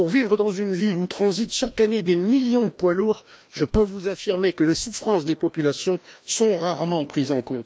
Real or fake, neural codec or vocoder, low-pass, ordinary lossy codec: fake; codec, 16 kHz, 1 kbps, FreqCodec, larger model; none; none